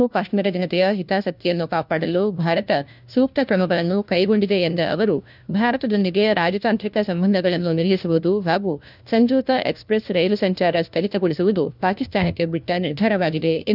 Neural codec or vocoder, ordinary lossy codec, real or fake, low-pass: codec, 16 kHz, 1 kbps, FunCodec, trained on LibriTTS, 50 frames a second; none; fake; 5.4 kHz